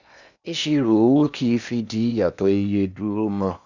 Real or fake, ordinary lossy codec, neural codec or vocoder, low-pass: fake; none; codec, 16 kHz in and 24 kHz out, 0.8 kbps, FocalCodec, streaming, 65536 codes; 7.2 kHz